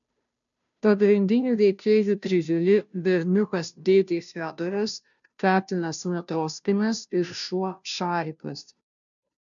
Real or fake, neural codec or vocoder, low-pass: fake; codec, 16 kHz, 0.5 kbps, FunCodec, trained on Chinese and English, 25 frames a second; 7.2 kHz